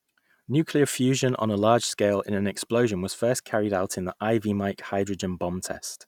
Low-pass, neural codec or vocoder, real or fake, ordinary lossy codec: 19.8 kHz; none; real; none